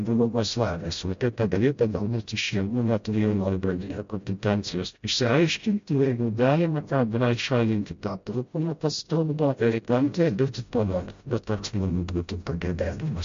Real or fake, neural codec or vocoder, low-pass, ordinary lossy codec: fake; codec, 16 kHz, 0.5 kbps, FreqCodec, smaller model; 7.2 kHz; MP3, 48 kbps